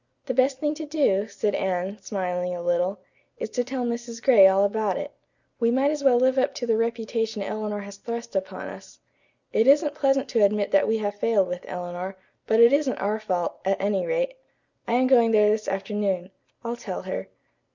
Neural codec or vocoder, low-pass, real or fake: none; 7.2 kHz; real